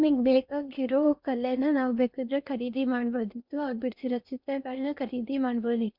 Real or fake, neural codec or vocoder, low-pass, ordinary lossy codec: fake; codec, 16 kHz in and 24 kHz out, 0.8 kbps, FocalCodec, streaming, 65536 codes; 5.4 kHz; none